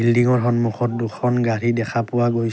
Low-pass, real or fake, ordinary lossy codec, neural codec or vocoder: none; real; none; none